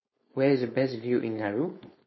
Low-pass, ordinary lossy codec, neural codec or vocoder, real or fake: 7.2 kHz; MP3, 24 kbps; codec, 16 kHz, 4.8 kbps, FACodec; fake